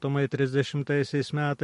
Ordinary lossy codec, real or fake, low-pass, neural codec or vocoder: MP3, 48 kbps; real; 14.4 kHz; none